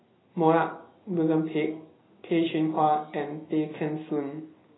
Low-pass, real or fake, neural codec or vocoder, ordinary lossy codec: 7.2 kHz; real; none; AAC, 16 kbps